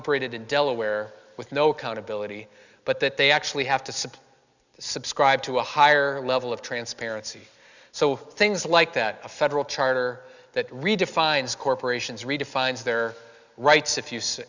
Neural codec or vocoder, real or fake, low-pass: none; real; 7.2 kHz